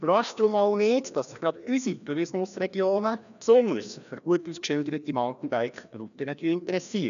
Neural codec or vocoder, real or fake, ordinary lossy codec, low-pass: codec, 16 kHz, 1 kbps, FreqCodec, larger model; fake; none; 7.2 kHz